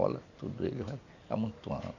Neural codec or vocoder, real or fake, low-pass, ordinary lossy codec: none; real; 7.2 kHz; none